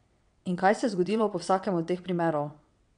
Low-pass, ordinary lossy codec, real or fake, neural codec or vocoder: 9.9 kHz; none; fake; vocoder, 22.05 kHz, 80 mel bands, WaveNeXt